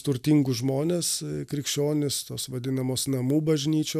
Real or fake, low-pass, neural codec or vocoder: real; 14.4 kHz; none